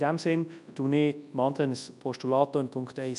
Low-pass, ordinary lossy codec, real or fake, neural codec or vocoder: 10.8 kHz; none; fake; codec, 24 kHz, 0.9 kbps, WavTokenizer, large speech release